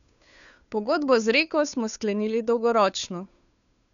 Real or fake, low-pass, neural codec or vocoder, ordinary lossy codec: fake; 7.2 kHz; codec, 16 kHz, 2 kbps, FunCodec, trained on Chinese and English, 25 frames a second; none